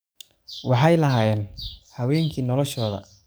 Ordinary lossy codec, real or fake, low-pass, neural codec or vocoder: none; fake; none; codec, 44.1 kHz, 7.8 kbps, DAC